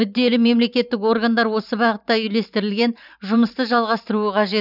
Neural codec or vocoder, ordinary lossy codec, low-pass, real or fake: vocoder, 44.1 kHz, 128 mel bands every 512 samples, BigVGAN v2; none; 5.4 kHz; fake